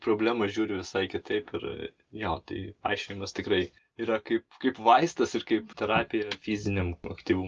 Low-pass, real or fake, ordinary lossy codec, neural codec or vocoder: 7.2 kHz; real; Opus, 24 kbps; none